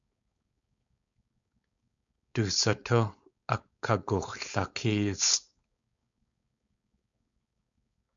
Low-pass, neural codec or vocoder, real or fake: 7.2 kHz; codec, 16 kHz, 4.8 kbps, FACodec; fake